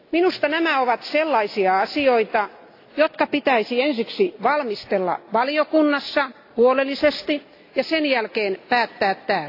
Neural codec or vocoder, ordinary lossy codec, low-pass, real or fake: none; AAC, 32 kbps; 5.4 kHz; real